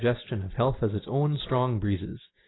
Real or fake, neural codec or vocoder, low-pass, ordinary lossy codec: real; none; 7.2 kHz; AAC, 16 kbps